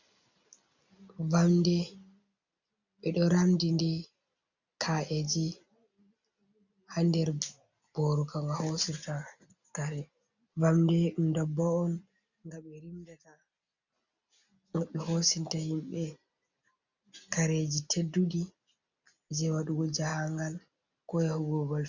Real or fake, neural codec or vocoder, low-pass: real; none; 7.2 kHz